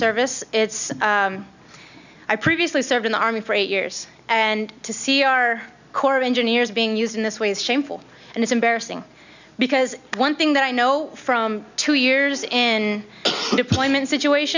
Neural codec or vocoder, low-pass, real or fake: none; 7.2 kHz; real